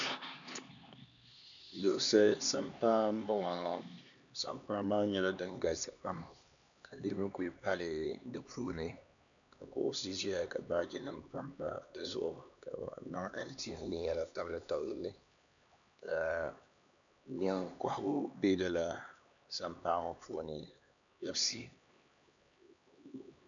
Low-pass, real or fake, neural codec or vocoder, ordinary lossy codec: 7.2 kHz; fake; codec, 16 kHz, 2 kbps, X-Codec, HuBERT features, trained on LibriSpeech; MP3, 96 kbps